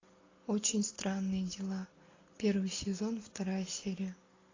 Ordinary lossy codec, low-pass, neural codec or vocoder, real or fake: AAC, 32 kbps; 7.2 kHz; none; real